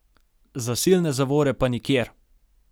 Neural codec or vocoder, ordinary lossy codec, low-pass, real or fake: none; none; none; real